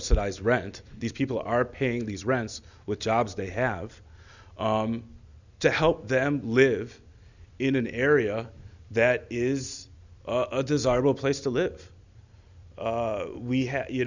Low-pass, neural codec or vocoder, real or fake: 7.2 kHz; none; real